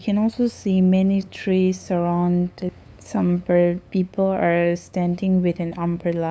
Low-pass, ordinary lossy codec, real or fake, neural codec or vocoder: none; none; fake; codec, 16 kHz, 8 kbps, FunCodec, trained on LibriTTS, 25 frames a second